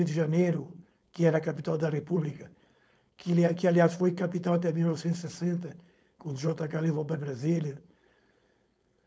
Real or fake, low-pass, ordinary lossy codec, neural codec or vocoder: fake; none; none; codec, 16 kHz, 4.8 kbps, FACodec